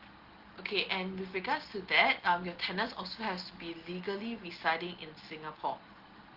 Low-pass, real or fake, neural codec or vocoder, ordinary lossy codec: 5.4 kHz; real; none; Opus, 16 kbps